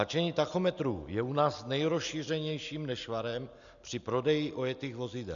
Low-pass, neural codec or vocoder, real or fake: 7.2 kHz; none; real